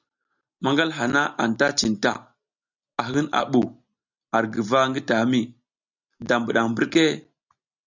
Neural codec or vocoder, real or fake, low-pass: none; real; 7.2 kHz